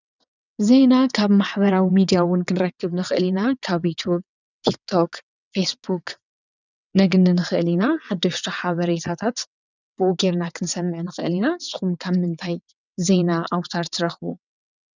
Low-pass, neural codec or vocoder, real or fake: 7.2 kHz; vocoder, 22.05 kHz, 80 mel bands, WaveNeXt; fake